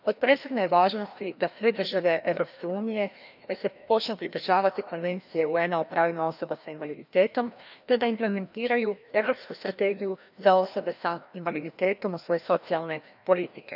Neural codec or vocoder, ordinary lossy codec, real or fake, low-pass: codec, 16 kHz, 1 kbps, FreqCodec, larger model; none; fake; 5.4 kHz